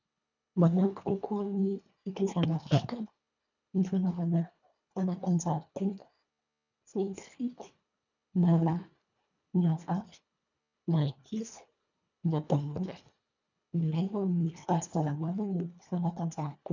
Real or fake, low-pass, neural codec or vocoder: fake; 7.2 kHz; codec, 24 kHz, 1.5 kbps, HILCodec